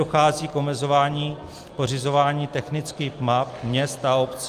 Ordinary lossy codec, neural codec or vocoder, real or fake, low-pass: Opus, 32 kbps; none; real; 14.4 kHz